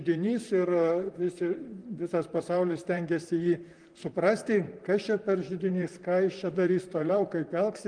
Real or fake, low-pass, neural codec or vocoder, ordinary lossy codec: fake; 9.9 kHz; vocoder, 44.1 kHz, 128 mel bands, Pupu-Vocoder; Opus, 24 kbps